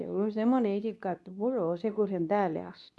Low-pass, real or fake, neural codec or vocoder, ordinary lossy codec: none; fake; codec, 24 kHz, 0.9 kbps, WavTokenizer, medium speech release version 2; none